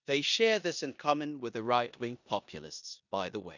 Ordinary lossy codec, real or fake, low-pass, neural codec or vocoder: none; fake; 7.2 kHz; codec, 16 kHz in and 24 kHz out, 0.9 kbps, LongCat-Audio-Codec, four codebook decoder